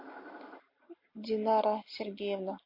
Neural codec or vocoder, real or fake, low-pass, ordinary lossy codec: none; real; 5.4 kHz; MP3, 32 kbps